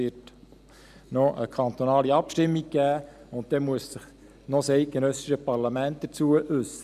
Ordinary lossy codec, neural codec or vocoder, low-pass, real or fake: none; none; 14.4 kHz; real